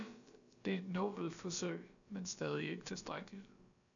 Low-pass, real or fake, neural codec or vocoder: 7.2 kHz; fake; codec, 16 kHz, about 1 kbps, DyCAST, with the encoder's durations